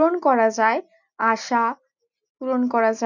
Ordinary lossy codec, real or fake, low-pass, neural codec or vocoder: none; real; 7.2 kHz; none